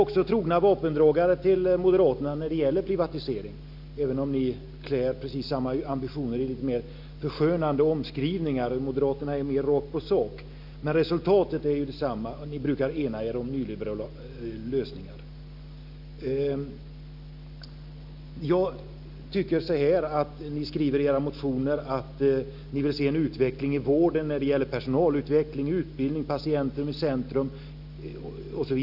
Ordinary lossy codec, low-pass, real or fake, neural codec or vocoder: none; 5.4 kHz; real; none